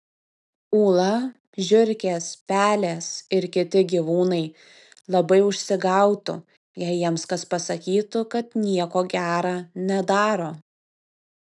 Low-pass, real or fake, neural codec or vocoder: 10.8 kHz; real; none